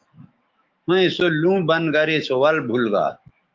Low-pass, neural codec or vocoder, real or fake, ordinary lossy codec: 7.2 kHz; autoencoder, 48 kHz, 128 numbers a frame, DAC-VAE, trained on Japanese speech; fake; Opus, 32 kbps